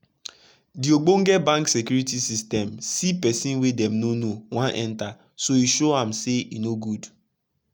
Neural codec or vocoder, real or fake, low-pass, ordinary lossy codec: none; real; none; none